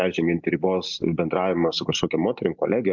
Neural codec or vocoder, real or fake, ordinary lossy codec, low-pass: none; real; MP3, 64 kbps; 7.2 kHz